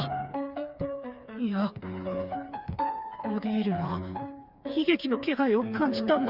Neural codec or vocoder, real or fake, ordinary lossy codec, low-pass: codec, 16 kHz, 4 kbps, FreqCodec, smaller model; fake; Opus, 64 kbps; 5.4 kHz